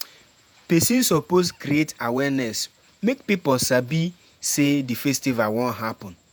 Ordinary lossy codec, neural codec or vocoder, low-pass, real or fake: none; vocoder, 48 kHz, 128 mel bands, Vocos; none; fake